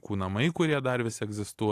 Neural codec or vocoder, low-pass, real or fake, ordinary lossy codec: none; 14.4 kHz; real; AAC, 64 kbps